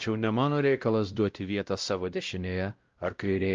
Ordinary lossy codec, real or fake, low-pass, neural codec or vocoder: Opus, 24 kbps; fake; 7.2 kHz; codec, 16 kHz, 0.5 kbps, X-Codec, WavLM features, trained on Multilingual LibriSpeech